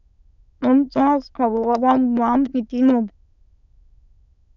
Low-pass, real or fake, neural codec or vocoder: 7.2 kHz; fake; autoencoder, 22.05 kHz, a latent of 192 numbers a frame, VITS, trained on many speakers